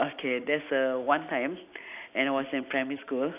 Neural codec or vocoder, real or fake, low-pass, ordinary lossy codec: none; real; 3.6 kHz; AAC, 32 kbps